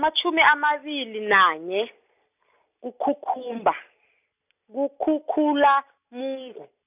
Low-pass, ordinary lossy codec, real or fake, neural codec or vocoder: 3.6 kHz; none; real; none